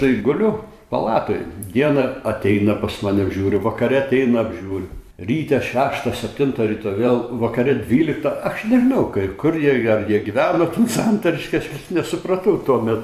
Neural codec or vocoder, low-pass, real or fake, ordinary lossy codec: vocoder, 44.1 kHz, 128 mel bands every 512 samples, BigVGAN v2; 14.4 kHz; fake; AAC, 96 kbps